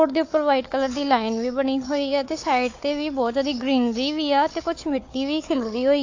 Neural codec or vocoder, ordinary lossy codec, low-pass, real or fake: codec, 16 kHz, 4 kbps, FunCodec, trained on Chinese and English, 50 frames a second; AAC, 48 kbps; 7.2 kHz; fake